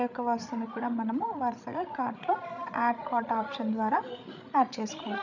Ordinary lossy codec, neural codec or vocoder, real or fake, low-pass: none; codec, 16 kHz, 16 kbps, FreqCodec, larger model; fake; 7.2 kHz